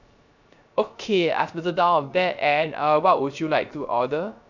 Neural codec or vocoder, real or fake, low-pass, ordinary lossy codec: codec, 16 kHz, 0.3 kbps, FocalCodec; fake; 7.2 kHz; none